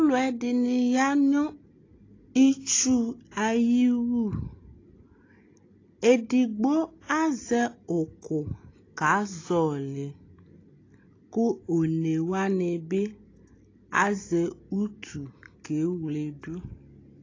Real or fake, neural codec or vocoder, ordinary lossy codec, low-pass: real; none; AAC, 32 kbps; 7.2 kHz